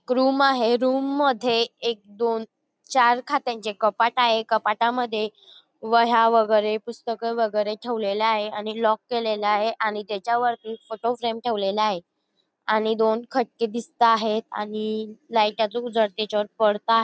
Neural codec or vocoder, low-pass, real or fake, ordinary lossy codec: none; none; real; none